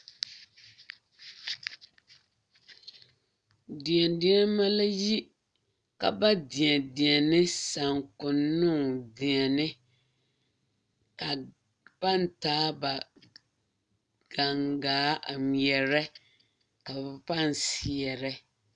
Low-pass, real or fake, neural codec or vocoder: 9.9 kHz; real; none